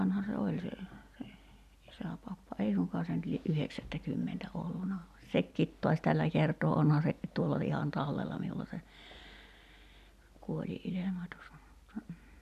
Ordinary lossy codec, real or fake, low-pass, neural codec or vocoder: none; real; 14.4 kHz; none